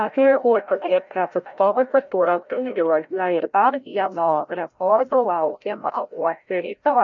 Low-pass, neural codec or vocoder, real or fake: 7.2 kHz; codec, 16 kHz, 0.5 kbps, FreqCodec, larger model; fake